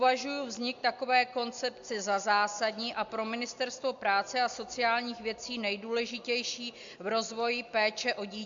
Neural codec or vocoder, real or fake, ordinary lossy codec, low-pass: none; real; MP3, 64 kbps; 7.2 kHz